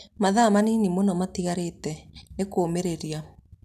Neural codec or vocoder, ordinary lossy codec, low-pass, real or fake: none; none; 14.4 kHz; real